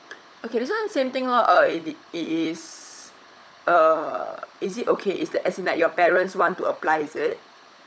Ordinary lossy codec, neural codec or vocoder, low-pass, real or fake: none; codec, 16 kHz, 16 kbps, FunCodec, trained on LibriTTS, 50 frames a second; none; fake